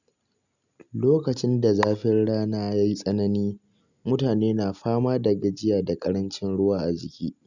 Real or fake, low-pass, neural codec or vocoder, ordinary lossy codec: real; 7.2 kHz; none; none